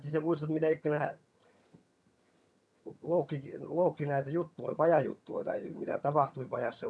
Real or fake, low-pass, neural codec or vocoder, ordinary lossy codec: fake; none; vocoder, 22.05 kHz, 80 mel bands, HiFi-GAN; none